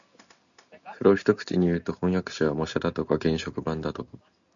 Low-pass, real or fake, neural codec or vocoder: 7.2 kHz; real; none